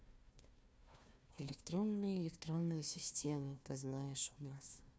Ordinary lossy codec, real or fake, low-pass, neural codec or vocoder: none; fake; none; codec, 16 kHz, 1 kbps, FunCodec, trained on Chinese and English, 50 frames a second